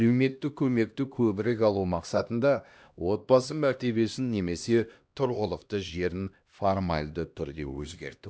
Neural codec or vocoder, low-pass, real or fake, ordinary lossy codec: codec, 16 kHz, 1 kbps, X-Codec, HuBERT features, trained on LibriSpeech; none; fake; none